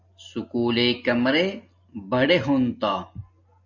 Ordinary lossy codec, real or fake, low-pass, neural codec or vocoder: AAC, 48 kbps; real; 7.2 kHz; none